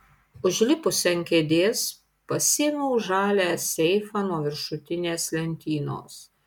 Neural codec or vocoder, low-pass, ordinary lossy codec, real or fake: none; 19.8 kHz; MP3, 96 kbps; real